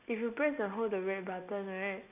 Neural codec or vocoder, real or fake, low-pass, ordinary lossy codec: none; real; 3.6 kHz; none